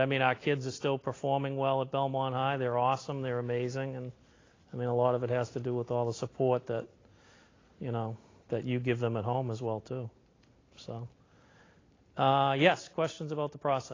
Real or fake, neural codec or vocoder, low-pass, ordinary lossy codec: real; none; 7.2 kHz; AAC, 32 kbps